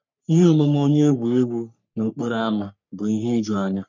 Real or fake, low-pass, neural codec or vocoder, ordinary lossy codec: fake; 7.2 kHz; codec, 44.1 kHz, 3.4 kbps, Pupu-Codec; none